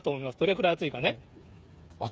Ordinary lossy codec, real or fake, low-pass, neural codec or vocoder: none; fake; none; codec, 16 kHz, 8 kbps, FreqCodec, smaller model